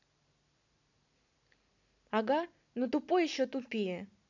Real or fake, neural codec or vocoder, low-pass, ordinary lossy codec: real; none; 7.2 kHz; none